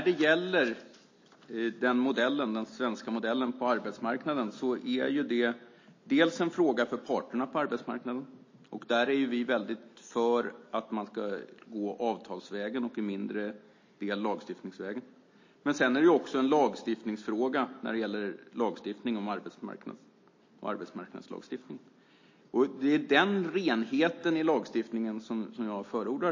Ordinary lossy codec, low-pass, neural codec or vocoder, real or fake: MP3, 32 kbps; 7.2 kHz; none; real